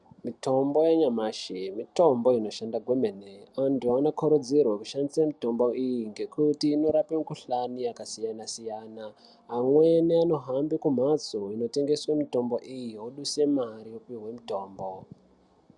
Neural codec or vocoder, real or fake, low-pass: none; real; 10.8 kHz